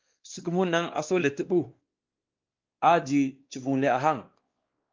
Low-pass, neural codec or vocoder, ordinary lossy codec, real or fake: 7.2 kHz; codec, 16 kHz, 2 kbps, X-Codec, WavLM features, trained on Multilingual LibriSpeech; Opus, 24 kbps; fake